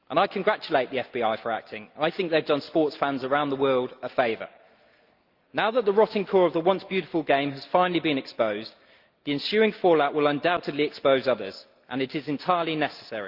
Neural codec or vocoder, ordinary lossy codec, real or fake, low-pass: none; Opus, 32 kbps; real; 5.4 kHz